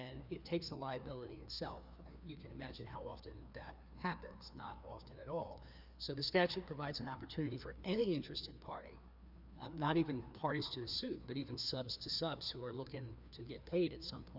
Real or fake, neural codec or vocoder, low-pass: fake; codec, 16 kHz, 2 kbps, FreqCodec, larger model; 5.4 kHz